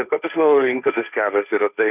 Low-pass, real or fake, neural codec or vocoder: 3.6 kHz; fake; codec, 16 kHz, 1.1 kbps, Voila-Tokenizer